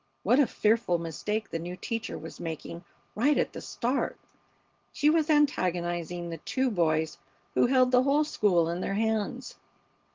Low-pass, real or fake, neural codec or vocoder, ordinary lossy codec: 7.2 kHz; real; none; Opus, 16 kbps